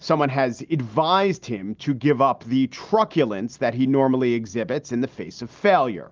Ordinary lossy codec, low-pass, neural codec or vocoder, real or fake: Opus, 32 kbps; 7.2 kHz; none; real